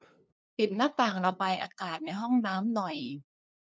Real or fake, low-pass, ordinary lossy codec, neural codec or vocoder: fake; none; none; codec, 16 kHz, 2 kbps, FunCodec, trained on LibriTTS, 25 frames a second